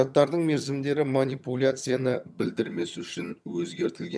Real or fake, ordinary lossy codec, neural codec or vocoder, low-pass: fake; none; vocoder, 22.05 kHz, 80 mel bands, HiFi-GAN; none